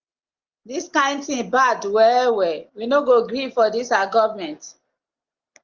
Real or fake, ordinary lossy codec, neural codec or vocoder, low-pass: real; Opus, 32 kbps; none; 7.2 kHz